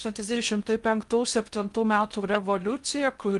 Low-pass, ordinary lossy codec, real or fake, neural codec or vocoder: 10.8 kHz; Opus, 32 kbps; fake; codec, 16 kHz in and 24 kHz out, 0.6 kbps, FocalCodec, streaming, 2048 codes